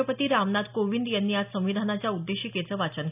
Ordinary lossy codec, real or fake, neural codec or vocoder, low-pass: none; real; none; 3.6 kHz